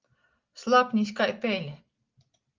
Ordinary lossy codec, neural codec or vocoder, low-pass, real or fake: Opus, 24 kbps; none; 7.2 kHz; real